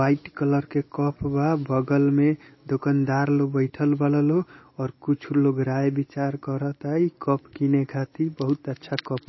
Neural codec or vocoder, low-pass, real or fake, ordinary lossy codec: none; 7.2 kHz; real; MP3, 24 kbps